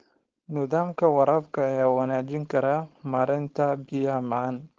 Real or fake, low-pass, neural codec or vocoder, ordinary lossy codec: fake; 7.2 kHz; codec, 16 kHz, 4.8 kbps, FACodec; Opus, 16 kbps